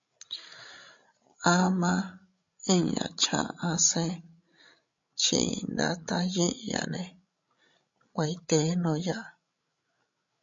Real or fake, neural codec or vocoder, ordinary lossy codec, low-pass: fake; codec, 16 kHz, 16 kbps, FreqCodec, larger model; MP3, 48 kbps; 7.2 kHz